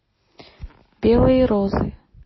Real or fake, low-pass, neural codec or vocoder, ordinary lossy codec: real; 7.2 kHz; none; MP3, 24 kbps